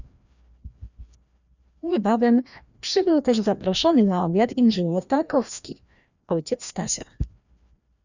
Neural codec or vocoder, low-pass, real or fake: codec, 16 kHz, 1 kbps, FreqCodec, larger model; 7.2 kHz; fake